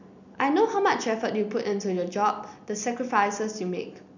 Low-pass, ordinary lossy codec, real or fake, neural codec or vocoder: 7.2 kHz; none; real; none